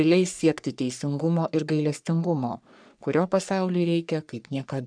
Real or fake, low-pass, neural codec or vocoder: fake; 9.9 kHz; codec, 44.1 kHz, 3.4 kbps, Pupu-Codec